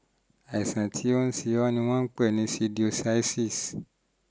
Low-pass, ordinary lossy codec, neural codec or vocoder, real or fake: none; none; none; real